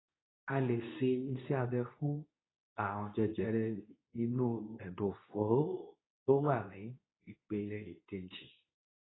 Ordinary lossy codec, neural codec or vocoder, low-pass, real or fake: AAC, 16 kbps; codec, 24 kHz, 0.9 kbps, WavTokenizer, medium speech release version 2; 7.2 kHz; fake